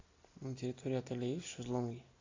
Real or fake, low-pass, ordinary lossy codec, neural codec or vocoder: real; 7.2 kHz; AAC, 48 kbps; none